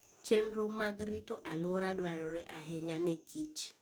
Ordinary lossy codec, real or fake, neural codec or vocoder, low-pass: none; fake; codec, 44.1 kHz, 2.6 kbps, DAC; none